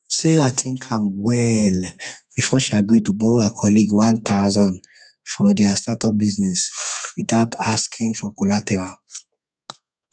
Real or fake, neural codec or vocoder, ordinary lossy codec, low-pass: fake; codec, 32 kHz, 1.9 kbps, SNAC; none; 9.9 kHz